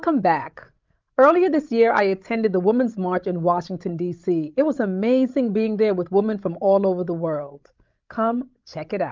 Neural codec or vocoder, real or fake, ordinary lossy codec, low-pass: codec, 16 kHz, 16 kbps, FreqCodec, larger model; fake; Opus, 32 kbps; 7.2 kHz